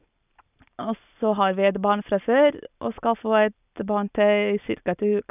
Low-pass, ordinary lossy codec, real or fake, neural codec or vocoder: 3.6 kHz; none; real; none